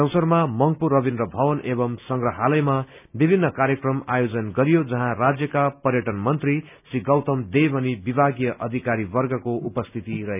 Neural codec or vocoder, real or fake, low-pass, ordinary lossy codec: none; real; 3.6 kHz; none